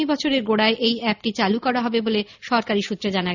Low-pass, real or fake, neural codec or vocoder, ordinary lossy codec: 7.2 kHz; real; none; none